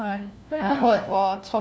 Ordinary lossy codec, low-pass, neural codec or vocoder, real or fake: none; none; codec, 16 kHz, 1 kbps, FunCodec, trained on LibriTTS, 50 frames a second; fake